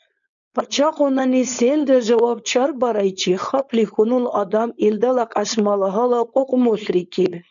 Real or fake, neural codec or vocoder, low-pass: fake; codec, 16 kHz, 4.8 kbps, FACodec; 7.2 kHz